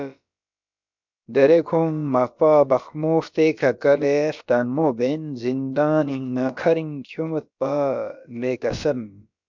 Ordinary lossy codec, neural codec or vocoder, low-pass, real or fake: MP3, 64 kbps; codec, 16 kHz, about 1 kbps, DyCAST, with the encoder's durations; 7.2 kHz; fake